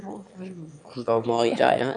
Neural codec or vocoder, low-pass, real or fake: autoencoder, 22.05 kHz, a latent of 192 numbers a frame, VITS, trained on one speaker; 9.9 kHz; fake